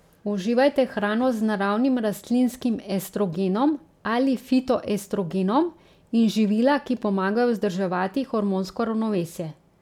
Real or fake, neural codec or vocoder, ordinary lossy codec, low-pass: real; none; none; 19.8 kHz